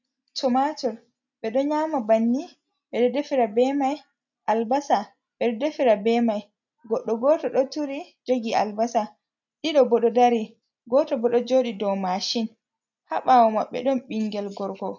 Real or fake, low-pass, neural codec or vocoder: real; 7.2 kHz; none